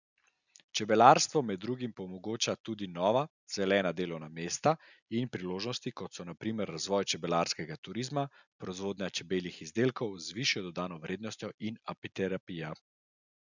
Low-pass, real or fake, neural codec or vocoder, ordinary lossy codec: 7.2 kHz; real; none; none